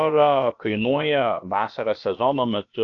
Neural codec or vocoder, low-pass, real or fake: codec, 16 kHz, about 1 kbps, DyCAST, with the encoder's durations; 7.2 kHz; fake